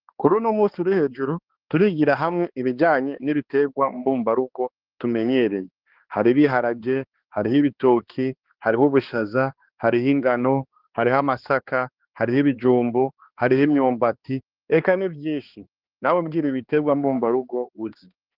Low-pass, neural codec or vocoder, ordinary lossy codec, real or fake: 5.4 kHz; codec, 16 kHz, 2 kbps, X-Codec, HuBERT features, trained on balanced general audio; Opus, 16 kbps; fake